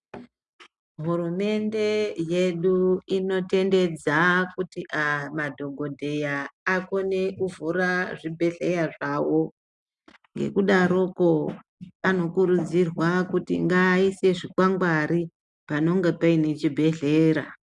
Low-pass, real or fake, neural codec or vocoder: 10.8 kHz; real; none